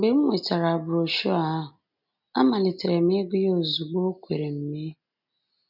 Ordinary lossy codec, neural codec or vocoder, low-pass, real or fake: none; none; 5.4 kHz; real